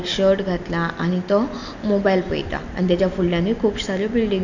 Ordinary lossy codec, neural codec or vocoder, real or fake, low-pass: none; none; real; 7.2 kHz